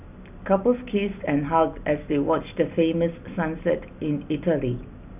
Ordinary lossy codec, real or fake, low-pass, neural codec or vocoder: none; real; 3.6 kHz; none